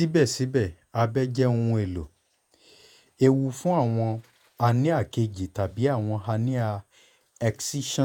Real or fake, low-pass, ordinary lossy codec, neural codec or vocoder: fake; 19.8 kHz; none; vocoder, 48 kHz, 128 mel bands, Vocos